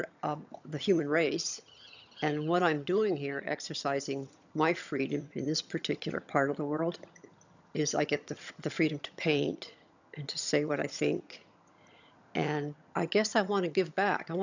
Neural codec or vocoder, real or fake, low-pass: vocoder, 22.05 kHz, 80 mel bands, HiFi-GAN; fake; 7.2 kHz